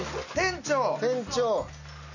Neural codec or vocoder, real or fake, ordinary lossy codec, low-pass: none; real; none; 7.2 kHz